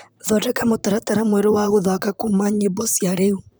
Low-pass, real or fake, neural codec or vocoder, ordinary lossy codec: none; fake; vocoder, 44.1 kHz, 128 mel bands, Pupu-Vocoder; none